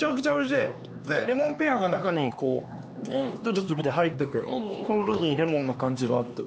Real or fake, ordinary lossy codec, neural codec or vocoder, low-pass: fake; none; codec, 16 kHz, 2 kbps, X-Codec, HuBERT features, trained on LibriSpeech; none